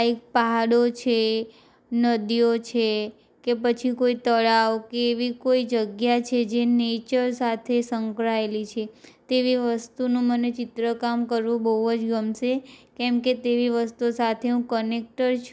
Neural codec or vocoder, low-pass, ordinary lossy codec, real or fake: none; none; none; real